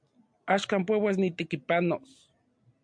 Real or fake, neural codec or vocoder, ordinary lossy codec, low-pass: real; none; MP3, 64 kbps; 9.9 kHz